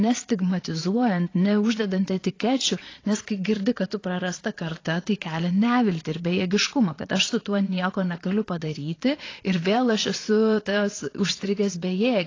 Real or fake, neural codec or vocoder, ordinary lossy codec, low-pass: fake; vocoder, 22.05 kHz, 80 mel bands, Vocos; AAC, 32 kbps; 7.2 kHz